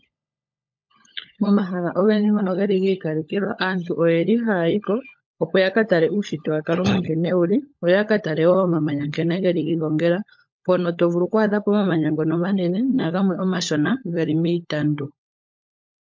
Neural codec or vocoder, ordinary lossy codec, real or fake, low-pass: codec, 16 kHz, 16 kbps, FunCodec, trained on LibriTTS, 50 frames a second; MP3, 48 kbps; fake; 7.2 kHz